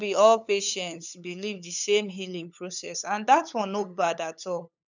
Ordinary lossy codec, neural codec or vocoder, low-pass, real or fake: none; codec, 16 kHz, 8 kbps, FunCodec, trained on LibriTTS, 25 frames a second; 7.2 kHz; fake